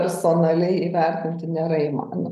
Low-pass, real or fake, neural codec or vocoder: 14.4 kHz; real; none